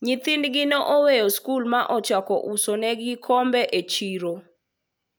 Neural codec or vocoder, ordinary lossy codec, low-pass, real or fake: none; none; none; real